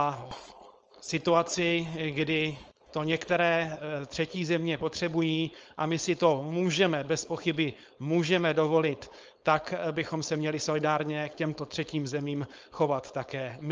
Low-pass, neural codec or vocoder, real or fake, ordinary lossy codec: 7.2 kHz; codec, 16 kHz, 4.8 kbps, FACodec; fake; Opus, 24 kbps